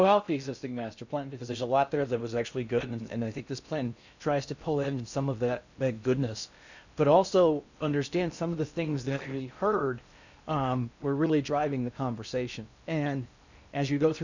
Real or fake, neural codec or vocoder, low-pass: fake; codec, 16 kHz in and 24 kHz out, 0.6 kbps, FocalCodec, streaming, 4096 codes; 7.2 kHz